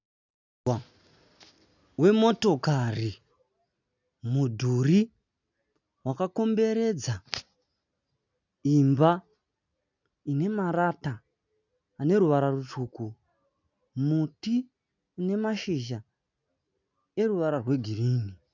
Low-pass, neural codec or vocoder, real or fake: 7.2 kHz; none; real